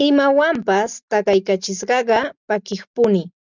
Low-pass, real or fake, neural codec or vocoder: 7.2 kHz; real; none